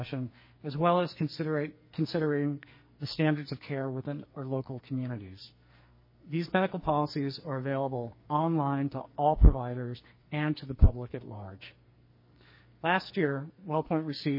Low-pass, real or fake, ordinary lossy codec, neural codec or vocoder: 5.4 kHz; fake; MP3, 24 kbps; codec, 44.1 kHz, 2.6 kbps, SNAC